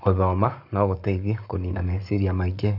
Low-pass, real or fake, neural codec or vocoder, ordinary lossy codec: 5.4 kHz; fake; codec, 44.1 kHz, 7.8 kbps, Pupu-Codec; none